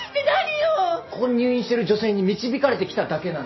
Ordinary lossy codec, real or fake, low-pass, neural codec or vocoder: MP3, 24 kbps; real; 7.2 kHz; none